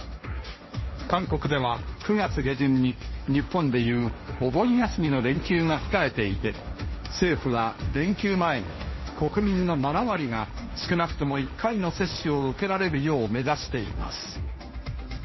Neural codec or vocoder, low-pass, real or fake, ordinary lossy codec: codec, 16 kHz, 1.1 kbps, Voila-Tokenizer; 7.2 kHz; fake; MP3, 24 kbps